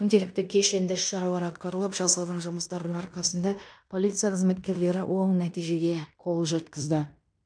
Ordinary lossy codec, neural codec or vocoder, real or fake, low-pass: MP3, 96 kbps; codec, 16 kHz in and 24 kHz out, 0.9 kbps, LongCat-Audio-Codec, fine tuned four codebook decoder; fake; 9.9 kHz